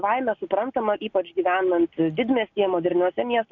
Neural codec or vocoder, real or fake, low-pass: none; real; 7.2 kHz